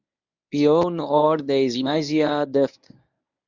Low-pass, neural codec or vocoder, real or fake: 7.2 kHz; codec, 24 kHz, 0.9 kbps, WavTokenizer, medium speech release version 1; fake